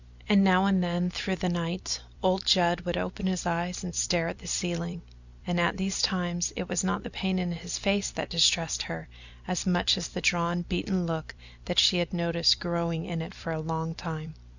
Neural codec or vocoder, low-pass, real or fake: vocoder, 44.1 kHz, 128 mel bands every 256 samples, BigVGAN v2; 7.2 kHz; fake